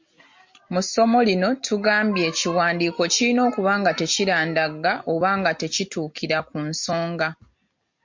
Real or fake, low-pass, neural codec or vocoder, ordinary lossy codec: real; 7.2 kHz; none; MP3, 48 kbps